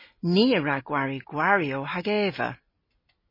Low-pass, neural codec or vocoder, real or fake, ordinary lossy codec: 5.4 kHz; none; real; MP3, 24 kbps